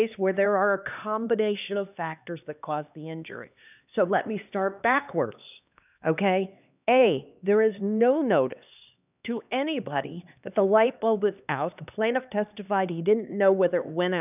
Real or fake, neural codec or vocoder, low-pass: fake; codec, 16 kHz, 2 kbps, X-Codec, HuBERT features, trained on LibriSpeech; 3.6 kHz